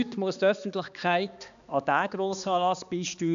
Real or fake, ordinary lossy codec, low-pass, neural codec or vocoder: fake; AAC, 96 kbps; 7.2 kHz; codec, 16 kHz, 2 kbps, X-Codec, HuBERT features, trained on balanced general audio